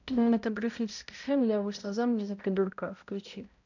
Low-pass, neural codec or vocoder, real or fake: 7.2 kHz; codec, 16 kHz, 0.5 kbps, X-Codec, HuBERT features, trained on balanced general audio; fake